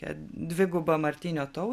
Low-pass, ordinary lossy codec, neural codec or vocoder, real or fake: 14.4 kHz; MP3, 96 kbps; none; real